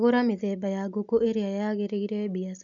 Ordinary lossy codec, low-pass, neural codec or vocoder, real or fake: none; 7.2 kHz; none; real